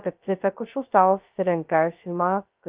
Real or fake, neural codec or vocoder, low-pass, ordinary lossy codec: fake; codec, 16 kHz, 0.2 kbps, FocalCodec; 3.6 kHz; Opus, 24 kbps